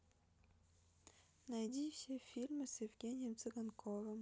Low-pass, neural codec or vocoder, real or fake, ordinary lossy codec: none; none; real; none